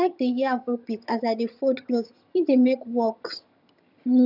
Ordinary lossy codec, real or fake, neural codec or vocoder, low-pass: none; fake; vocoder, 22.05 kHz, 80 mel bands, HiFi-GAN; 5.4 kHz